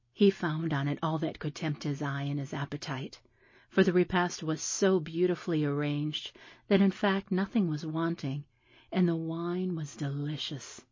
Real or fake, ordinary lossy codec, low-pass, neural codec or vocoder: real; MP3, 32 kbps; 7.2 kHz; none